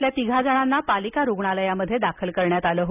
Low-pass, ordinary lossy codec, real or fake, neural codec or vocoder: 3.6 kHz; none; real; none